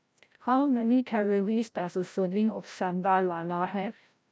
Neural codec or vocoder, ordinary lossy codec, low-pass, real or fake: codec, 16 kHz, 0.5 kbps, FreqCodec, larger model; none; none; fake